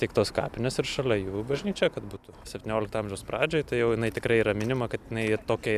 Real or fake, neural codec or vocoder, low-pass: real; none; 14.4 kHz